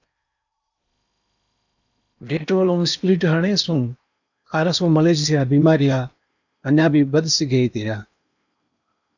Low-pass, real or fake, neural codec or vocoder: 7.2 kHz; fake; codec, 16 kHz in and 24 kHz out, 0.8 kbps, FocalCodec, streaming, 65536 codes